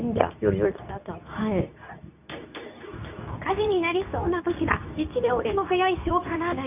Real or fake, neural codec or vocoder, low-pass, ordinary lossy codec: fake; codec, 24 kHz, 0.9 kbps, WavTokenizer, medium speech release version 2; 3.6 kHz; none